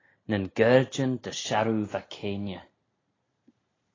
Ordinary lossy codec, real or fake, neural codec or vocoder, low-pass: AAC, 32 kbps; real; none; 7.2 kHz